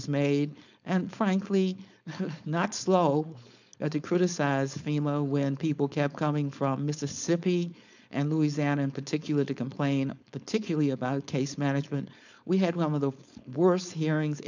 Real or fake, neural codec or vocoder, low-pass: fake; codec, 16 kHz, 4.8 kbps, FACodec; 7.2 kHz